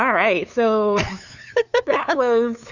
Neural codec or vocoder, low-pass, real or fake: codec, 16 kHz, 2 kbps, FunCodec, trained on LibriTTS, 25 frames a second; 7.2 kHz; fake